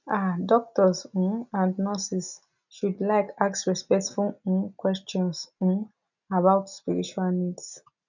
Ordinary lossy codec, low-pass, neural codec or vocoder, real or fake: none; 7.2 kHz; none; real